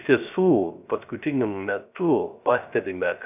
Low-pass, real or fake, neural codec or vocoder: 3.6 kHz; fake; codec, 16 kHz, 0.3 kbps, FocalCodec